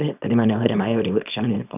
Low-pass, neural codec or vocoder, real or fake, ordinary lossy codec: 3.6 kHz; codec, 24 kHz, 0.9 kbps, WavTokenizer, small release; fake; none